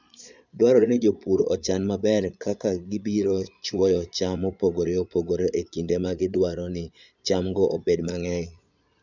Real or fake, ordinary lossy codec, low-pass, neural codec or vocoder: fake; none; 7.2 kHz; vocoder, 22.05 kHz, 80 mel bands, Vocos